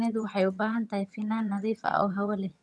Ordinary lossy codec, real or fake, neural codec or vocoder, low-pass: none; fake; vocoder, 22.05 kHz, 80 mel bands, HiFi-GAN; none